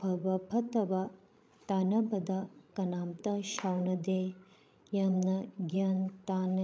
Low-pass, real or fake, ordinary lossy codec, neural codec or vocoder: none; fake; none; codec, 16 kHz, 16 kbps, FreqCodec, larger model